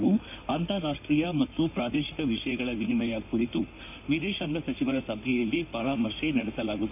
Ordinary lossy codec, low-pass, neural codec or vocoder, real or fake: none; 3.6 kHz; codec, 16 kHz in and 24 kHz out, 2.2 kbps, FireRedTTS-2 codec; fake